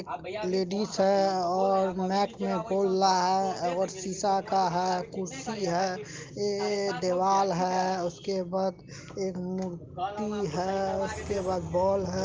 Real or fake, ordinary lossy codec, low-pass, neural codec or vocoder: real; Opus, 24 kbps; 7.2 kHz; none